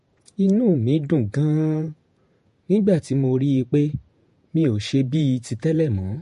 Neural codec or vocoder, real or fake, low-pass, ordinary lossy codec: vocoder, 48 kHz, 128 mel bands, Vocos; fake; 14.4 kHz; MP3, 48 kbps